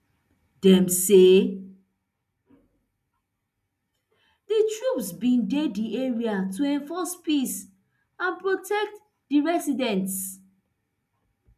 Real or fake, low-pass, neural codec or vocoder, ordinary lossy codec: real; 14.4 kHz; none; none